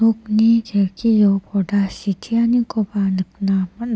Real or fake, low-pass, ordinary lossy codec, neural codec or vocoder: real; none; none; none